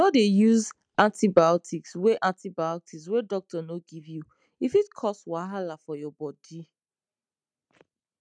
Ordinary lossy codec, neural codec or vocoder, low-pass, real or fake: none; none; 9.9 kHz; real